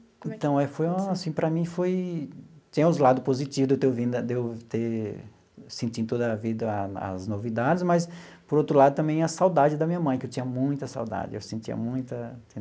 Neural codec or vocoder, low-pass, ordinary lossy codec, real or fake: none; none; none; real